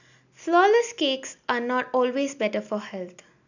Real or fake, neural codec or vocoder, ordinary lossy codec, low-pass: real; none; none; 7.2 kHz